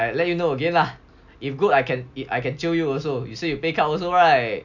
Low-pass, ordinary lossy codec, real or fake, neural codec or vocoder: 7.2 kHz; none; real; none